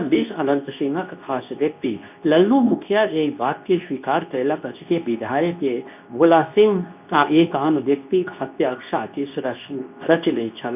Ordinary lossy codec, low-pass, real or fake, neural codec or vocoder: none; 3.6 kHz; fake; codec, 24 kHz, 0.9 kbps, WavTokenizer, medium speech release version 2